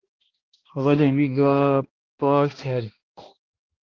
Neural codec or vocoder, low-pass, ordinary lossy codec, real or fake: codec, 16 kHz, 1 kbps, X-Codec, HuBERT features, trained on balanced general audio; 7.2 kHz; Opus, 16 kbps; fake